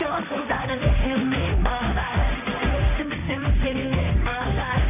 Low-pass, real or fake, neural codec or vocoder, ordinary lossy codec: 3.6 kHz; fake; codec, 16 kHz, 1.1 kbps, Voila-Tokenizer; none